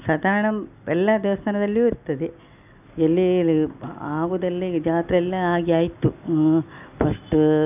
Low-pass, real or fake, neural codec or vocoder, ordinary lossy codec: 3.6 kHz; real; none; none